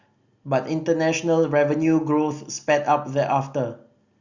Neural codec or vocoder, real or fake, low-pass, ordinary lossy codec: none; real; 7.2 kHz; Opus, 64 kbps